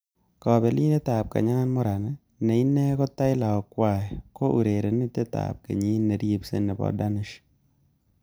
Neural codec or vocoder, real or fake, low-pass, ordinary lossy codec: none; real; none; none